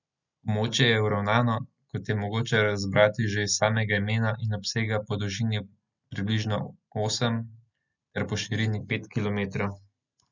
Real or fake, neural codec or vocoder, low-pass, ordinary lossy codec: real; none; 7.2 kHz; none